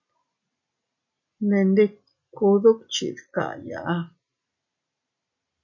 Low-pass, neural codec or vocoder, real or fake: 7.2 kHz; none; real